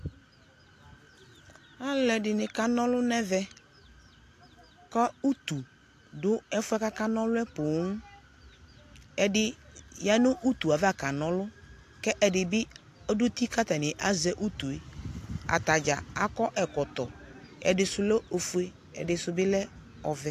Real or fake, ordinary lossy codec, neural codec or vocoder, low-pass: real; AAC, 64 kbps; none; 14.4 kHz